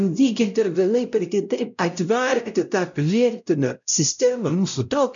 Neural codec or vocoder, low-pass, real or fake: codec, 16 kHz, 0.5 kbps, X-Codec, WavLM features, trained on Multilingual LibriSpeech; 7.2 kHz; fake